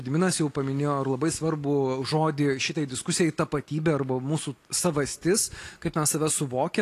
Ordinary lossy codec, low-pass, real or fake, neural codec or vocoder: AAC, 48 kbps; 14.4 kHz; real; none